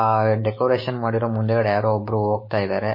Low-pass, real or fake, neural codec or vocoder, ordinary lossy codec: 5.4 kHz; fake; codec, 16 kHz, 6 kbps, DAC; MP3, 24 kbps